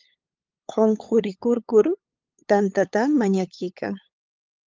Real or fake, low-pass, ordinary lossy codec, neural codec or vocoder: fake; 7.2 kHz; Opus, 24 kbps; codec, 16 kHz, 8 kbps, FunCodec, trained on LibriTTS, 25 frames a second